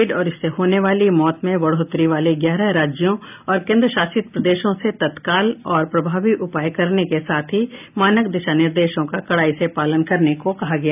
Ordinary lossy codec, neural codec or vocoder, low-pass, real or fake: none; none; 3.6 kHz; real